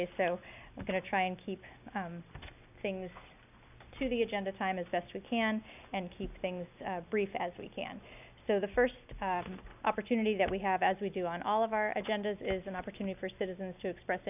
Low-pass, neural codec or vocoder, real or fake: 3.6 kHz; none; real